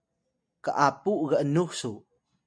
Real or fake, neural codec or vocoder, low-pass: real; none; 9.9 kHz